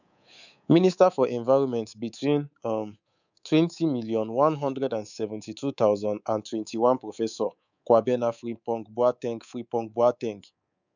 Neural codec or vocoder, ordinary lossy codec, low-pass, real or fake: codec, 24 kHz, 3.1 kbps, DualCodec; none; 7.2 kHz; fake